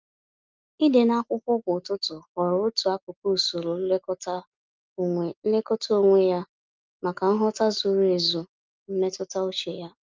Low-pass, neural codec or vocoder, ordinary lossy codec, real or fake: 7.2 kHz; none; Opus, 32 kbps; real